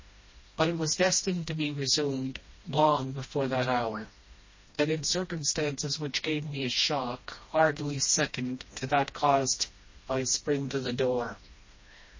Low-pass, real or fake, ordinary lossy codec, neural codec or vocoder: 7.2 kHz; fake; MP3, 32 kbps; codec, 16 kHz, 1 kbps, FreqCodec, smaller model